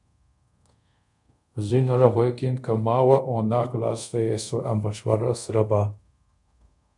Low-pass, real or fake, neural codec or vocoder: 10.8 kHz; fake; codec, 24 kHz, 0.5 kbps, DualCodec